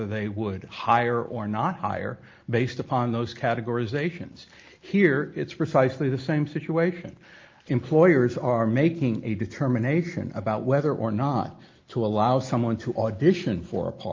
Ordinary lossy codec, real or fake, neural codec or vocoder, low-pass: Opus, 32 kbps; real; none; 7.2 kHz